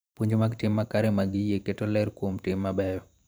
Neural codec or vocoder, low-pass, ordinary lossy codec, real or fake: vocoder, 44.1 kHz, 128 mel bands every 512 samples, BigVGAN v2; none; none; fake